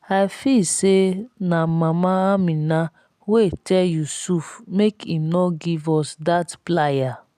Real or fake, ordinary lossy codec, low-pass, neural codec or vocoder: real; none; 14.4 kHz; none